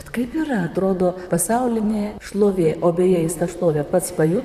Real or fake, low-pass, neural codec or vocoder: fake; 14.4 kHz; vocoder, 44.1 kHz, 128 mel bands, Pupu-Vocoder